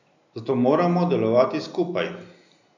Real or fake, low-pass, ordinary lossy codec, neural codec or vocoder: real; 7.2 kHz; none; none